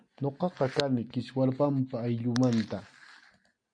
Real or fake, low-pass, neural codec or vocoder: real; 9.9 kHz; none